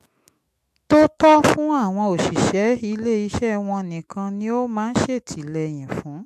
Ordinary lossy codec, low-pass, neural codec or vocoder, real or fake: MP3, 64 kbps; 14.4 kHz; autoencoder, 48 kHz, 128 numbers a frame, DAC-VAE, trained on Japanese speech; fake